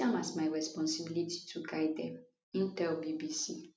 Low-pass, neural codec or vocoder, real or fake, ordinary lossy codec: none; none; real; none